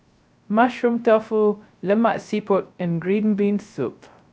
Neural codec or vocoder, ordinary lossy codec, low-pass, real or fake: codec, 16 kHz, 0.3 kbps, FocalCodec; none; none; fake